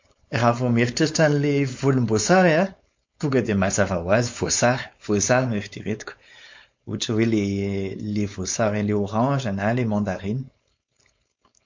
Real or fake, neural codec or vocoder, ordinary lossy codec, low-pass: fake; codec, 16 kHz, 4.8 kbps, FACodec; MP3, 48 kbps; 7.2 kHz